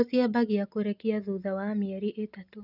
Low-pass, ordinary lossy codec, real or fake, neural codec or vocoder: 5.4 kHz; none; real; none